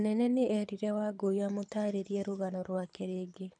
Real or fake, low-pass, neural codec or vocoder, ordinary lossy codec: fake; 9.9 kHz; codec, 24 kHz, 6 kbps, HILCodec; none